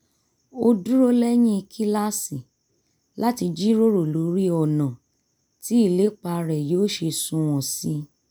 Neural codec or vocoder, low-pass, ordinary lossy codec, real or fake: none; none; none; real